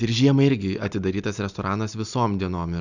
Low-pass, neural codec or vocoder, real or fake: 7.2 kHz; none; real